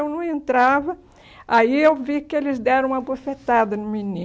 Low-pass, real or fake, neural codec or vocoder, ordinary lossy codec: none; real; none; none